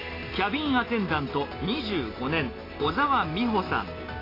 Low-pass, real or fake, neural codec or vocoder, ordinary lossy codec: 5.4 kHz; real; none; AAC, 24 kbps